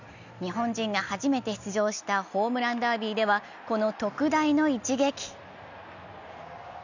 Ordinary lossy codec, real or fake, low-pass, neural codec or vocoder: none; real; 7.2 kHz; none